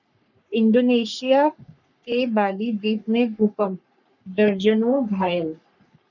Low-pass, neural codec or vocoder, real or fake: 7.2 kHz; codec, 44.1 kHz, 3.4 kbps, Pupu-Codec; fake